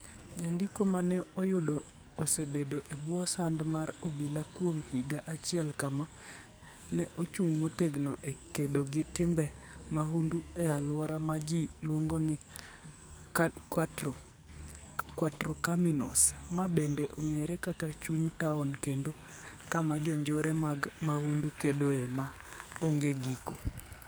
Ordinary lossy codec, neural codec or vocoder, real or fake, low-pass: none; codec, 44.1 kHz, 2.6 kbps, SNAC; fake; none